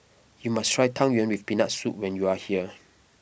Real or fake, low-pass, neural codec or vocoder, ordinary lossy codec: real; none; none; none